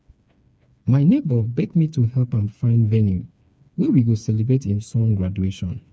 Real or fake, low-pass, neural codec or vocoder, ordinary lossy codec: fake; none; codec, 16 kHz, 4 kbps, FreqCodec, smaller model; none